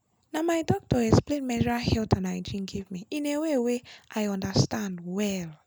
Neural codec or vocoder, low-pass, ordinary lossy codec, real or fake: none; none; none; real